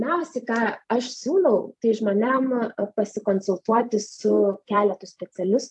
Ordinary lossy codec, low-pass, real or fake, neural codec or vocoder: AAC, 64 kbps; 10.8 kHz; fake; vocoder, 44.1 kHz, 128 mel bands every 256 samples, BigVGAN v2